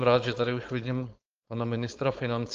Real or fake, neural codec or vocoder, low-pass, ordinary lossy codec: fake; codec, 16 kHz, 4.8 kbps, FACodec; 7.2 kHz; Opus, 24 kbps